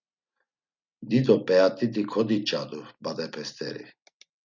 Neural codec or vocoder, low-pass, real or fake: none; 7.2 kHz; real